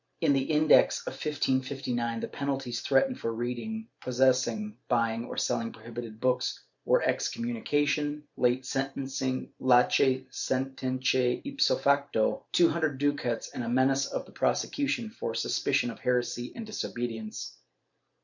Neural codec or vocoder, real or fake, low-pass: vocoder, 44.1 kHz, 128 mel bands every 512 samples, BigVGAN v2; fake; 7.2 kHz